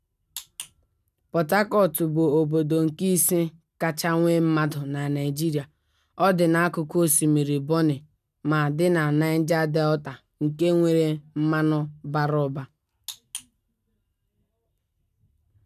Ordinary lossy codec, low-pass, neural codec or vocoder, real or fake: none; 14.4 kHz; none; real